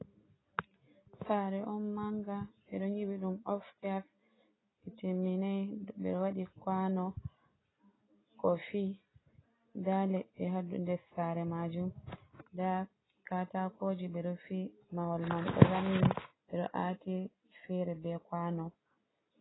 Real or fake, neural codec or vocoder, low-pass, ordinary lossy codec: real; none; 7.2 kHz; AAC, 16 kbps